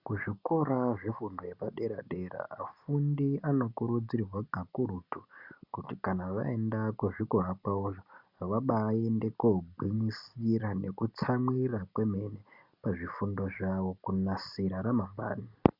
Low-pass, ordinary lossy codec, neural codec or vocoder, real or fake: 5.4 kHz; Opus, 64 kbps; none; real